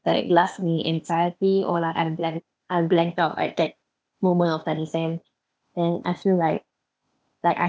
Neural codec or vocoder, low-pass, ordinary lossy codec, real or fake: codec, 16 kHz, 0.8 kbps, ZipCodec; none; none; fake